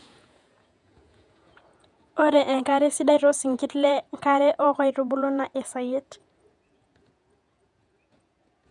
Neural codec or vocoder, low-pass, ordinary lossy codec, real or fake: vocoder, 48 kHz, 128 mel bands, Vocos; 10.8 kHz; none; fake